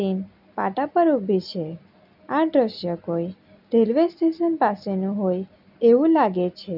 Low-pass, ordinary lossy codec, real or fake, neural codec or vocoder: 5.4 kHz; none; real; none